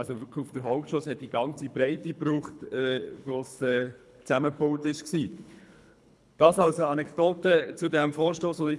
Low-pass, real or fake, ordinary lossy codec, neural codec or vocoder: none; fake; none; codec, 24 kHz, 3 kbps, HILCodec